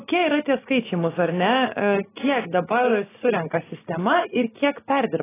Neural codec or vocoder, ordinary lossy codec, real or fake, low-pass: none; AAC, 16 kbps; real; 3.6 kHz